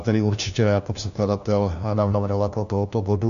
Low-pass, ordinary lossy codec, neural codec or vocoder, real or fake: 7.2 kHz; AAC, 96 kbps; codec, 16 kHz, 1 kbps, FunCodec, trained on LibriTTS, 50 frames a second; fake